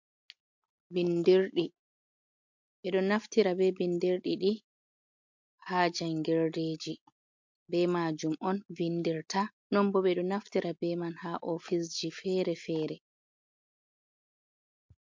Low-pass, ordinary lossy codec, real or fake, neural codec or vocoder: 7.2 kHz; MP3, 48 kbps; real; none